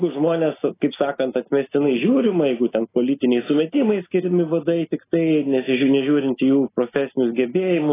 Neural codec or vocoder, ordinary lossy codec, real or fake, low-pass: none; AAC, 16 kbps; real; 3.6 kHz